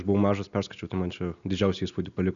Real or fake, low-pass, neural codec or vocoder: real; 7.2 kHz; none